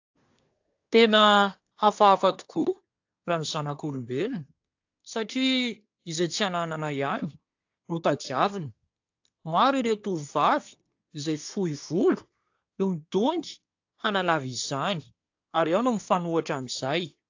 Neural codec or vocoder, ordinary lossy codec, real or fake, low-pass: codec, 24 kHz, 1 kbps, SNAC; AAC, 48 kbps; fake; 7.2 kHz